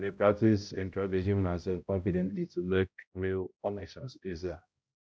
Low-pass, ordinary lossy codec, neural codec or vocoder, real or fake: none; none; codec, 16 kHz, 0.5 kbps, X-Codec, HuBERT features, trained on balanced general audio; fake